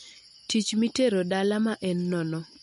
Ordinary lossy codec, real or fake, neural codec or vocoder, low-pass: MP3, 48 kbps; real; none; 14.4 kHz